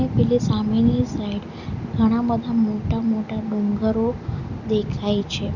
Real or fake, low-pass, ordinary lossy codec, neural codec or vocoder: real; 7.2 kHz; none; none